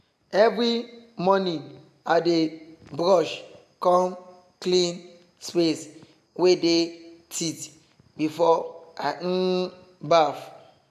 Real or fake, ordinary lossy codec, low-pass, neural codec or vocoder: real; AAC, 96 kbps; 14.4 kHz; none